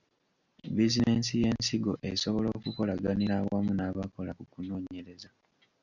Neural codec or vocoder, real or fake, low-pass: none; real; 7.2 kHz